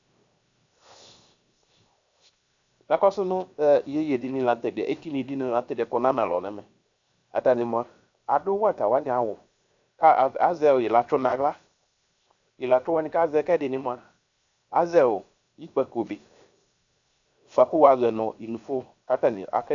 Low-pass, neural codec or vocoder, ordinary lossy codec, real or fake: 7.2 kHz; codec, 16 kHz, 0.7 kbps, FocalCodec; AAC, 64 kbps; fake